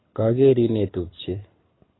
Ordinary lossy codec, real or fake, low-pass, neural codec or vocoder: AAC, 16 kbps; fake; 7.2 kHz; codec, 24 kHz, 6 kbps, HILCodec